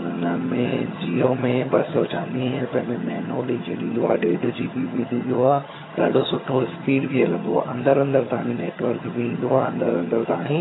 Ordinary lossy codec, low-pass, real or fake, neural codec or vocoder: AAC, 16 kbps; 7.2 kHz; fake; vocoder, 22.05 kHz, 80 mel bands, HiFi-GAN